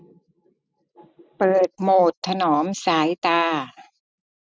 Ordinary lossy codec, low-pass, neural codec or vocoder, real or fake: none; none; none; real